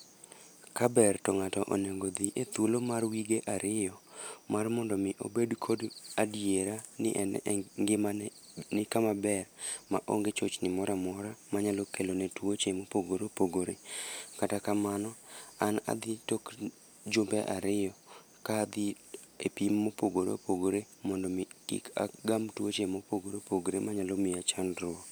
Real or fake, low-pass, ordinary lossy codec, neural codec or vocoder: real; none; none; none